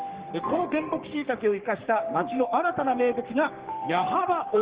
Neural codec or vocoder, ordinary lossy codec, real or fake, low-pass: codec, 44.1 kHz, 3.4 kbps, Pupu-Codec; Opus, 16 kbps; fake; 3.6 kHz